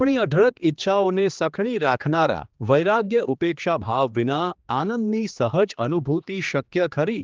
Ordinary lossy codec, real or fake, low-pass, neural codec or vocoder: Opus, 24 kbps; fake; 7.2 kHz; codec, 16 kHz, 2 kbps, X-Codec, HuBERT features, trained on general audio